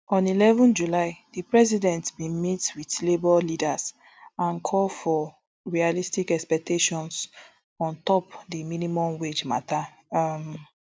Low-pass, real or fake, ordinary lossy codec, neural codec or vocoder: none; real; none; none